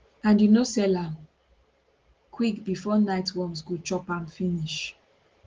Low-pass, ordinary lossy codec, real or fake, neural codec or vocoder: 7.2 kHz; Opus, 16 kbps; real; none